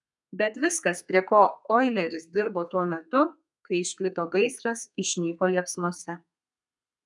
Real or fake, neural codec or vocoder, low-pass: fake; codec, 32 kHz, 1.9 kbps, SNAC; 10.8 kHz